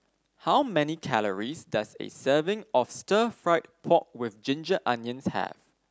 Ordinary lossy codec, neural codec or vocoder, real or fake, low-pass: none; none; real; none